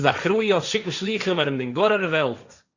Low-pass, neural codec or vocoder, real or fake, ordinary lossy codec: 7.2 kHz; codec, 16 kHz, 1.1 kbps, Voila-Tokenizer; fake; Opus, 64 kbps